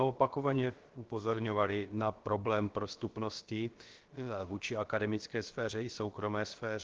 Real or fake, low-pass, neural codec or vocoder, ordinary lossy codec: fake; 7.2 kHz; codec, 16 kHz, about 1 kbps, DyCAST, with the encoder's durations; Opus, 16 kbps